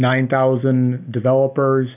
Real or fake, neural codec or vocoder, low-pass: real; none; 3.6 kHz